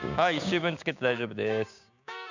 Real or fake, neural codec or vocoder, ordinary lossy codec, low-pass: fake; codec, 16 kHz, 6 kbps, DAC; none; 7.2 kHz